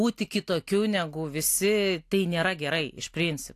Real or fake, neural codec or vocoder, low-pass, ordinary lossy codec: real; none; 14.4 kHz; AAC, 64 kbps